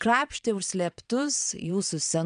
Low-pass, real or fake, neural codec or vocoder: 9.9 kHz; fake; vocoder, 22.05 kHz, 80 mel bands, Vocos